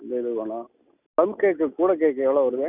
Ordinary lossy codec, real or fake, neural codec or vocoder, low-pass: none; real; none; 3.6 kHz